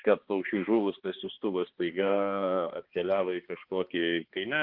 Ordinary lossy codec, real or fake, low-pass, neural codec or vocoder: Opus, 16 kbps; fake; 5.4 kHz; codec, 16 kHz, 2 kbps, X-Codec, HuBERT features, trained on balanced general audio